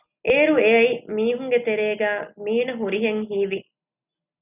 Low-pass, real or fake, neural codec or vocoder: 3.6 kHz; real; none